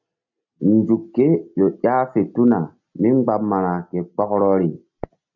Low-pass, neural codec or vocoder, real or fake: 7.2 kHz; none; real